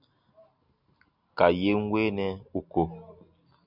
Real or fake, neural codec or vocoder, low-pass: real; none; 5.4 kHz